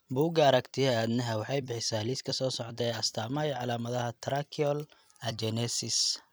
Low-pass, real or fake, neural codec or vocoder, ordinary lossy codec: none; fake; vocoder, 44.1 kHz, 128 mel bands every 256 samples, BigVGAN v2; none